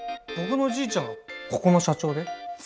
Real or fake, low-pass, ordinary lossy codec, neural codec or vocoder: real; none; none; none